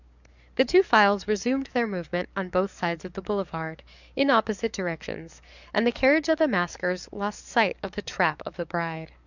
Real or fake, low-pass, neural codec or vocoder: fake; 7.2 kHz; codec, 44.1 kHz, 7.8 kbps, DAC